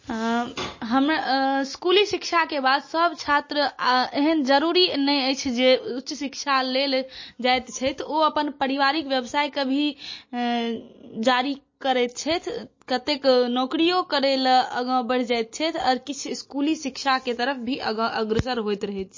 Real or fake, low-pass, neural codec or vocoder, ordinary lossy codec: real; 7.2 kHz; none; MP3, 32 kbps